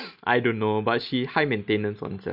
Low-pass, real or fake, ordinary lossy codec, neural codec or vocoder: 5.4 kHz; real; none; none